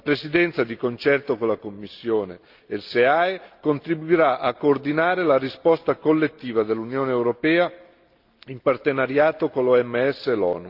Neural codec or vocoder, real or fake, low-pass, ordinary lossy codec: none; real; 5.4 kHz; Opus, 32 kbps